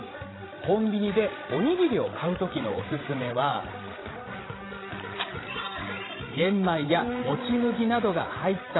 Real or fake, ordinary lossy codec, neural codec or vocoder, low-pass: fake; AAC, 16 kbps; codec, 16 kHz, 16 kbps, FreqCodec, larger model; 7.2 kHz